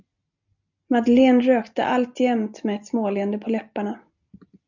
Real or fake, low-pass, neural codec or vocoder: real; 7.2 kHz; none